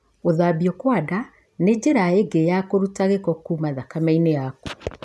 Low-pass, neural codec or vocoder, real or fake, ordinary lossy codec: none; none; real; none